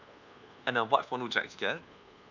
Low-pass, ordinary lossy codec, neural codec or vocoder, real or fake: 7.2 kHz; none; codec, 24 kHz, 1.2 kbps, DualCodec; fake